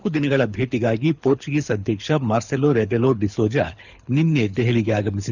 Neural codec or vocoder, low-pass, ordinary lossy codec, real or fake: codec, 24 kHz, 6 kbps, HILCodec; 7.2 kHz; none; fake